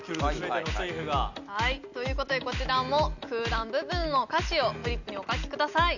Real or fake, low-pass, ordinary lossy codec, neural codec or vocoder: real; 7.2 kHz; none; none